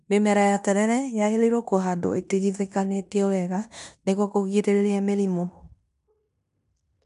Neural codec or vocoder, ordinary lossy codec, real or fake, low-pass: codec, 16 kHz in and 24 kHz out, 0.9 kbps, LongCat-Audio-Codec, fine tuned four codebook decoder; none; fake; 10.8 kHz